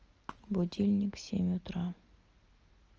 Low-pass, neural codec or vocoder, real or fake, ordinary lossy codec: 7.2 kHz; none; real; Opus, 24 kbps